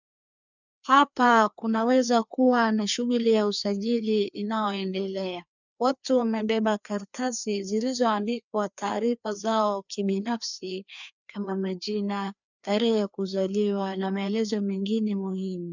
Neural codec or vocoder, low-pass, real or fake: codec, 16 kHz, 2 kbps, FreqCodec, larger model; 7.2 kHz; fake